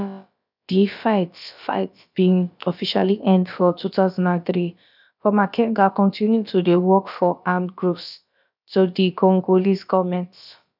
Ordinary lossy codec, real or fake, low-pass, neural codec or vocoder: none; fake; 5.4 kHz; codec, 16 kHz, about 1 kbps, DyCAST, with the encoder's durations